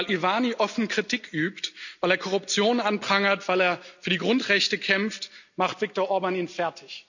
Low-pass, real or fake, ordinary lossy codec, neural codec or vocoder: 7.2 kHz; real; none; none